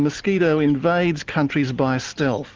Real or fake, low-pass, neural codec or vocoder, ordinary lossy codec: real; 7.2 kHz; none; Opus, 32 kbps